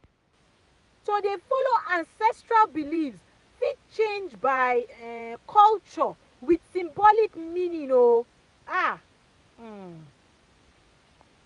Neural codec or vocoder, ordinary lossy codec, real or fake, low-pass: vocoder, 24 kHz, 100 mel bands, Vocos; none; fake; 10.8 kHz